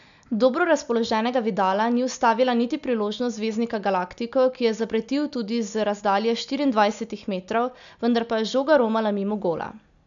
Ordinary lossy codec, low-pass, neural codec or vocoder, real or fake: none; 7.2 kHz; none; real